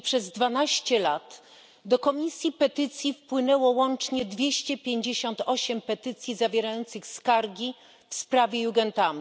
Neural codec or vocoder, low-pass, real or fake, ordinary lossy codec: none; none; real; none